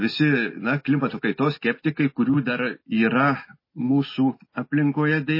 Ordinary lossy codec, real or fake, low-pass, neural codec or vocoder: MP3, 24 kbps; real; 5.4 kHz; none